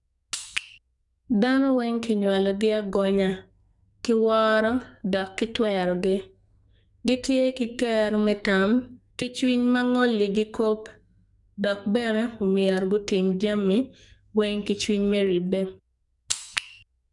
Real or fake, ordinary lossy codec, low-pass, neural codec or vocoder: fake; none; 10.8 kHz; codec, 44.1 kHz, 2.6 kbps, SNAC